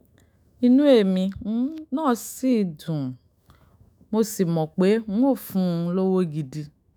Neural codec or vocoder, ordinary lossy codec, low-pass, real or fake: autoencoder, 48 kHz, 128 numbers a frame, DAC-VAE, trained on Japanese speech; none; none; fake